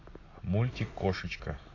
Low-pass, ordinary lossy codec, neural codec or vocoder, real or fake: 7.2 kHz; AAC, 32 kbps; none; real